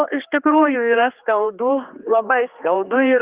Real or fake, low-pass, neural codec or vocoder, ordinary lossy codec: fake; 3.6 kHz; codec, 16 kHz, 2 kbps, X-Codec, HuBERT features, trained on general audio; Opus, 32 kbps